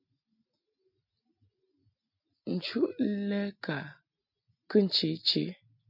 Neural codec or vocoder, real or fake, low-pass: none; real; 5.4 kHz